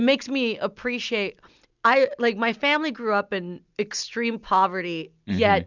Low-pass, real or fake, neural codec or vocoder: 7.2 kHz; real; none